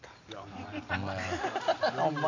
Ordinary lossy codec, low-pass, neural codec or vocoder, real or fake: none; 7.2 kHz; none; real